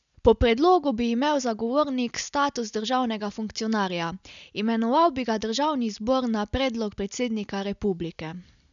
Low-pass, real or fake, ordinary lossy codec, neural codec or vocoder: 7.2 kHz; real; none; none